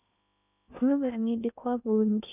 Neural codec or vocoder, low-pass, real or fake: codec, 16 kHz in and 24 kHz out, 0.8 kbps, FocalCodec, streaming, 65536 codes; 3.6 kHz; fake